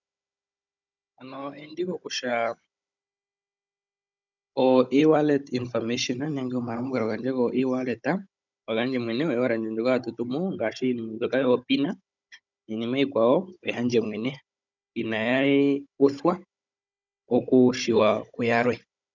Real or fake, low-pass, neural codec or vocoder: fake; 7.2 kHz; codec, 16 kHz, 16 kbps, FunCodec, trained on Chinese and English, 50 frames a second